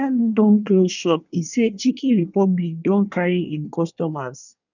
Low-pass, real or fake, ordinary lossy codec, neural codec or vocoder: 7.2 kHz; fake; none; codec, 24 kHz, 1 kbps, SNAC